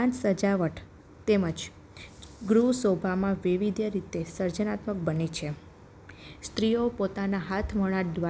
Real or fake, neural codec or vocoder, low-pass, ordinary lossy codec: real; none; none; none